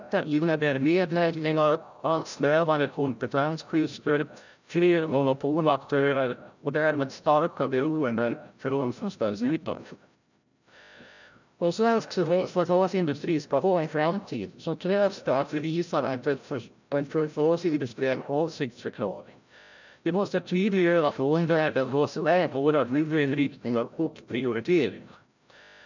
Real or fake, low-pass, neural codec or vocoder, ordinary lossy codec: fake; 7.2 kHz; codec, 16 kHz, 0.5 kbps, FreqCodec, larger model; none